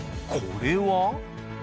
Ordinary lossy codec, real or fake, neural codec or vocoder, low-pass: none; real; none; none